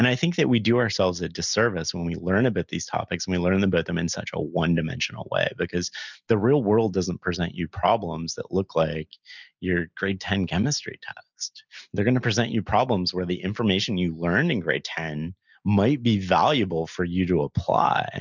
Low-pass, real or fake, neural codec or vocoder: 7.2 kHz; real; none